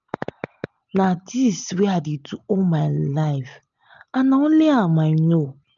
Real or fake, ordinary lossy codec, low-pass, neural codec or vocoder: real; none; 7.2 kHz; none